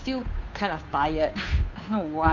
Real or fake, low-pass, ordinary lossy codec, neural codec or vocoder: fake; 7.2 kHz; none; codec, 16 kHz, 2 kbps, FunCodec, trained on Chinese and English, 25 frames a second